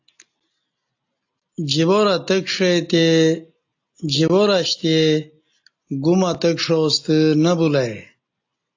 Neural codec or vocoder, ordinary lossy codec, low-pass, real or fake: none; AAC, 48 kbps; 7.2 kHz; real